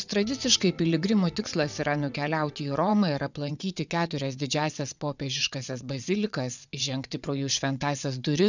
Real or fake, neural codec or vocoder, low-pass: fake; vocoder, 22.05 kHz, 80 mel bands, WaveNeXt; 7.2 kHz